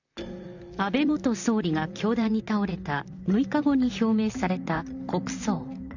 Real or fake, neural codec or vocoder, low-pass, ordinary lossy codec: fake; vocoder, 44.1 kHz, 128 mel bands, Pupu-Vocoder; 7.2 kHz; none